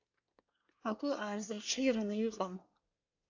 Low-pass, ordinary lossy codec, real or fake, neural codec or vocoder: 7.2 kHz; AAC, 48 kbps; fake; codec, 24 kHz, 1 kbps, SNAC